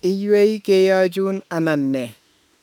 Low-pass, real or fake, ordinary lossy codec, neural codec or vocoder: 19.8 kHz; fake; none; autoencoder, 48 kHz, 32 numbers a frame, DAC-VAE, trained on Japanese speech